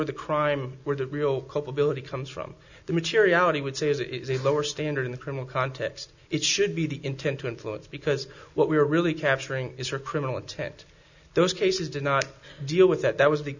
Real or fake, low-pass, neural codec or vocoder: real; 7.2 kHz; none